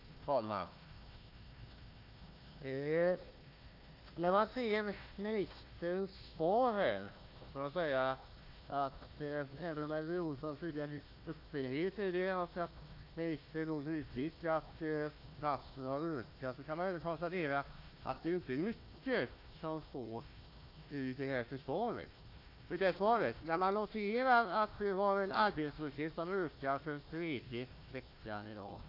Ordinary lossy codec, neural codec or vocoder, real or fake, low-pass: AAC, 32 kbps; codec, 16 kHz, 1 kbps, FunCodec, trained on Chinese and English, 50 frames a second; fake; 5.4 kHz